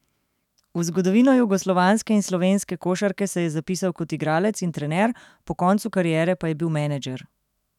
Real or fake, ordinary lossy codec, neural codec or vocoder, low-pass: fake; none; autoencoder, 48 kHz, 128 numbers a frame, DAC-VAE, trained on Japanese speech; 19.8 kHz